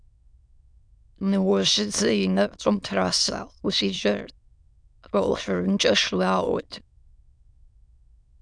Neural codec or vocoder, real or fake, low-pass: autoencoder, 22.05 kHz, a latent of 192 numbers a frame, VITS, trained on many speakers; fake; 9.9 kHz